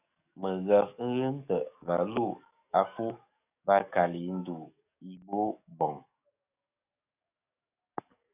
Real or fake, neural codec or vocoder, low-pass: fake; codec, 44.1 kHz, 7.8 kbps, DAC; 3.6 kHz